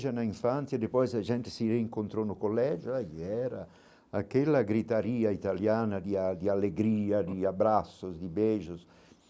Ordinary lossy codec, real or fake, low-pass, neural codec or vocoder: none; real; none; none